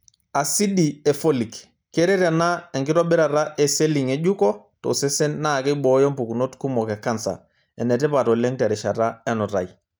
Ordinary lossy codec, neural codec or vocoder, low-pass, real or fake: none; none; none; real